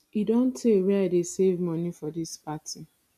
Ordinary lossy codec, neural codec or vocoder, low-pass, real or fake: none; none; 14.4 kHz; real